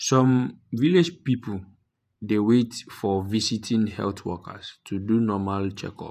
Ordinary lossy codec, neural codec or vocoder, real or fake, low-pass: none; vocoder, 48 kHz, 128 mel bands, Vocos; fake; 14.4 kHz